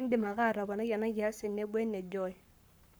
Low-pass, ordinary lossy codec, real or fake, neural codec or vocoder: none; none; fake; codec, 44.1 kHz, 7.8 kbps, Pupu-Codec